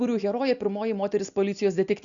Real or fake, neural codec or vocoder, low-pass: real; none; 7.2 kHz